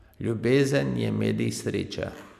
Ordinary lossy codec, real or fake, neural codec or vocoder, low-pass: none; real; none; 14.4 kHz